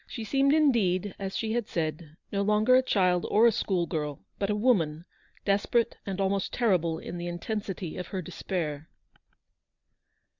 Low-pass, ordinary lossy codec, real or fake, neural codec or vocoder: 7.2 kHz; Opus, 64 kbps; real; none